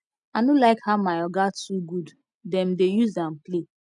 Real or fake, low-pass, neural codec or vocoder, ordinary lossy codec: real; 10.8 kHz; none; none